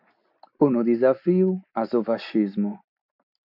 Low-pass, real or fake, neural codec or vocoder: 5.4 kHz; real; none